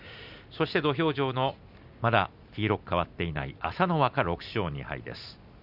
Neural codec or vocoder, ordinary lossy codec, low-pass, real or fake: none; none; 5.4 kHz; real